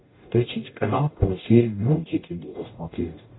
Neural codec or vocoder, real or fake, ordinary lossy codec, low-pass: codec, 44.1 kHz, 0.9 kbps, DAC; fake; AAC, 16 kbps; 7.2 kHz